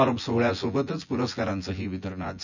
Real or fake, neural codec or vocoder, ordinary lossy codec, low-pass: fake; vocoder, 24 kHz, 100 mel bands, Vocos; none; 7.2 kHz